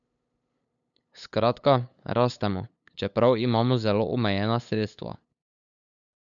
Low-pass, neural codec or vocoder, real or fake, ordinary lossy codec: 7.2 kHz; codec, 16 kHz, 8 kbps, FunCodec, trained on LibriTTS, 25 frames a second; fake; none